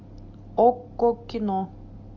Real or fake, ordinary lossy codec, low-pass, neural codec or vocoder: real; MP3, 48 kbps; 7.2 kHz; none